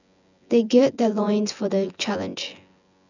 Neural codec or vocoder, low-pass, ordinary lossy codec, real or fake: vocoder, 24 kHz, 100 mel bands, Vocos; 7.2 kHz; none; fake